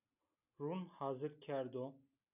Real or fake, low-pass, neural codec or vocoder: real; 3.6 kHz; none